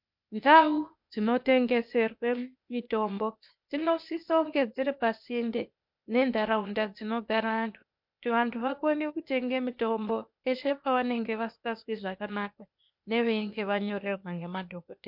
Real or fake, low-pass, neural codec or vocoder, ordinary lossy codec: fake; 5.4 kHz; codec, 16 kHz, 0.8 kbps, ZipCodec; MP3, 48 kbps